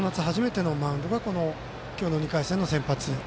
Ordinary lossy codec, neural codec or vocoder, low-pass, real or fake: none; none; none; real